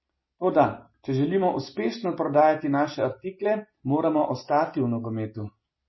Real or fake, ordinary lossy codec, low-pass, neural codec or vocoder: real; MP3, 24 kbps; 7.2 kHz; none